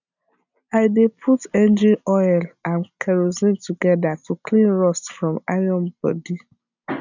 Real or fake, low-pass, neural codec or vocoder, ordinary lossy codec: real; 7.2 kHz; none; none